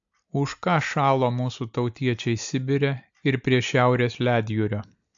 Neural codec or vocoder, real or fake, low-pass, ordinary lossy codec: none; real; 7.2 kHz; AAC, 64 kbps